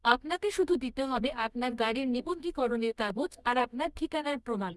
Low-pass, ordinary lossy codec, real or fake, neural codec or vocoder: none; none; fake; codec, 24 kHz, 0.9 kbps, WavTokenizer, medium music audio release